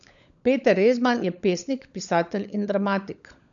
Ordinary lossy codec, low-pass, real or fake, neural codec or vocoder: none; 7.2 kHz; fake; codec, 16 kHz, 16 kbps, FunCodec, trained on LibriTTS, 50 frames a second